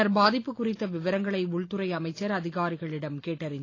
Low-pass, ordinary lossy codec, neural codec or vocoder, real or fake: 7.2 kHz; AAC, 32 kbps; none; real